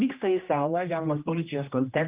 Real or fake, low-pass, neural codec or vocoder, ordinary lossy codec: fake; 3.6 kHz; codec, 16 kHz, 1 kbps, X-Codec, HuBERT features, trained on general audio; Opus, 32 kbps